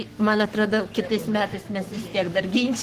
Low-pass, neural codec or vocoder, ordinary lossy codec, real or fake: 14.4 kHz; codec, 44.1 kHz, 7.8 kbps, Pupu-Codec; Opus, 16 kbps; fake